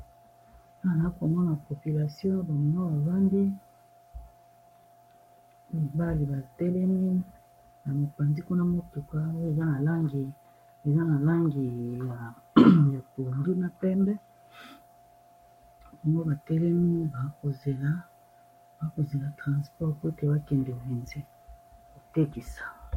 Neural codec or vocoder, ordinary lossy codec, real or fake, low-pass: codec, 44.1 kHz, 7.8 kbps, Pupu-Codec; MP3, 64 kbps; fake; 19.8 kHz